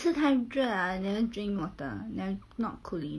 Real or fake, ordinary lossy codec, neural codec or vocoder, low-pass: real; none; none; none